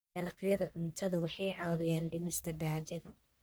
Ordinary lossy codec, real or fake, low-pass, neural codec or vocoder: none; fake; none; codec, 44.1 kHz, 1.7 kbps, Pupu-Codec